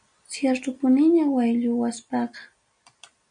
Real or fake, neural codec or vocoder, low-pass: real; none; 9.9 kHz